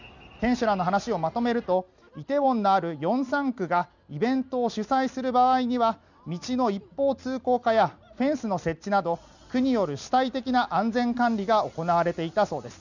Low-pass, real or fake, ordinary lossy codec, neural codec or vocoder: 7.2 kHz; real; none; none